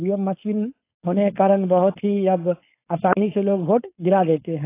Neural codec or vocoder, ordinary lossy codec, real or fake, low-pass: codec, 16 kHz, 4.8 kbps, FACodec; AAC, 24 kbps; fake; 3.6 kHz